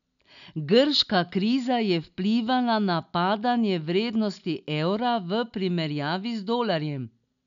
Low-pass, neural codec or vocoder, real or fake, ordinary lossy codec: 7.2 kHz; none; real; none